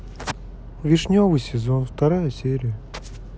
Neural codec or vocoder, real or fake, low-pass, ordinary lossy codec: none; real; none; none